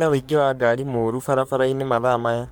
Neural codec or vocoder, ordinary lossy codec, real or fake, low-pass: codec, 44.1 kHz, 3.4 kbps, Pupu-Codec; none; fake; none